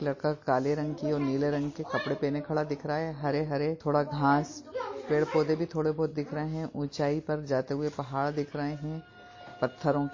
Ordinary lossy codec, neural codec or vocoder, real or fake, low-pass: MP3, 32 kbps; none; real; 7.2 kHz